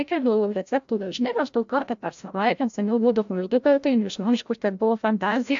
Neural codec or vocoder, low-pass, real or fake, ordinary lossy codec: codec, 16 kHz, 0.5 kbps, FreqCodec, larger model; 7.2 kHz; fake; AAC, 64 kbps